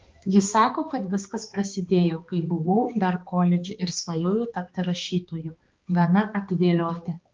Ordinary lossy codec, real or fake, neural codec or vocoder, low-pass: Opus, 24 kbps; fake; codec, 16 kHz, 2 kbps, X-Codec, HuBERT features, trained on balanced general audio; 7.2 kHz